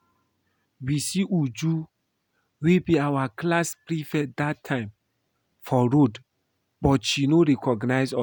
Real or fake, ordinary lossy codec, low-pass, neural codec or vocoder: real; none; none; none